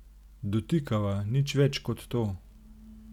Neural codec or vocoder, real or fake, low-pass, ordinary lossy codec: none; real; 19.8 kHz; none